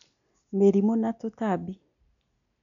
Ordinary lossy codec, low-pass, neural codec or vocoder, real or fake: none; 7.2 kHz; none; real